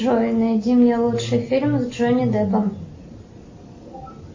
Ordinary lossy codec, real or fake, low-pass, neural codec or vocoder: MP3, 32 kbps; real; 7.2 kHz; none